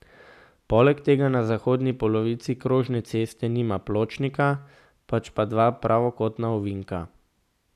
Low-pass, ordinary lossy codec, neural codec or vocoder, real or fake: 14.4 kHz; none; none; real